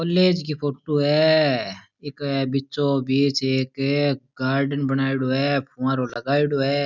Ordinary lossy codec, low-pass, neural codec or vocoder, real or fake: none; 7.2 kHz; none; real